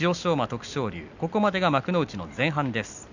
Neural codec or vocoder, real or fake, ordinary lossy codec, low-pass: none; real; none; 7.2 kHz